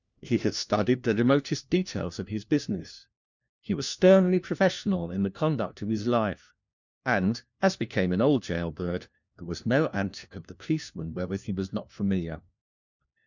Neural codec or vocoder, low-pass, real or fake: codec, 16 kHz, 1 kbps, FunCodec, trained on LibriTTS, 50 frames a second; 7.2 kHz; fake